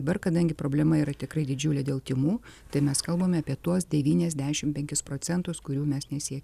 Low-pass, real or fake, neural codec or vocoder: 14.4 kHz; real; none